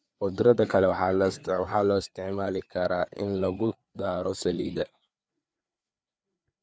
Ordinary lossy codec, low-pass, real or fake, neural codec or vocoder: none; none; fake; codec, 16 kHz, 4 kbps, FreqCodec, larger model